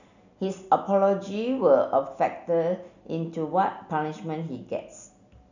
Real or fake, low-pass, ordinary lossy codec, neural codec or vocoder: real; 7.2 kHz; none; none